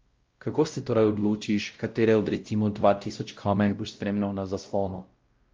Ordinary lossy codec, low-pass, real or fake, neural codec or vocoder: Opus, 24 kbps; 7.2 kHz; fake; codec, 16 kHz, 0.5 kbps, X-Codec, WavLM features, trained on Multilingual LibriSpeech